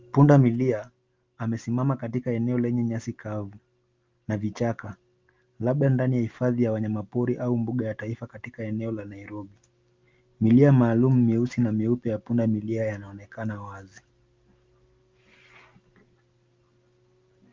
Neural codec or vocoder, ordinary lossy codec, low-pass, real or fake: none; Opus, 32 kbps; 7.2 kHz; real